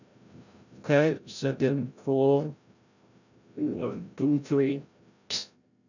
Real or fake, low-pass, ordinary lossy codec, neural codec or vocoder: fake; 7.2 kHz; none; codec, 16 kHz, 0.5 kbps, FreqCodec, larger model